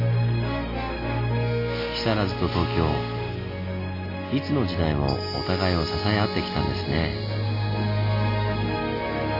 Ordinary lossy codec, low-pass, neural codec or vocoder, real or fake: MP3, 32 kbps; 5.4 kHz; none; real